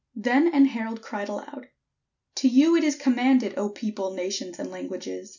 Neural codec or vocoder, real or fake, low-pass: none; real; 7.2 kHz